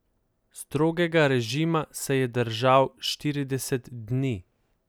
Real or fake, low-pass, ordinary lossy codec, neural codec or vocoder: real; none; none; none